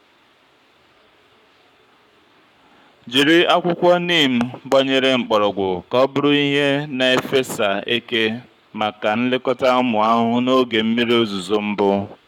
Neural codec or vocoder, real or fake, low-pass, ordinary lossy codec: codec, 44.1 kHz, 7.8 kbps, Pupu-Codec; fake; 19.8 kHz; none